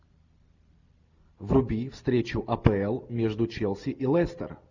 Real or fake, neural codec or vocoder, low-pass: real; none; 7.2 kHz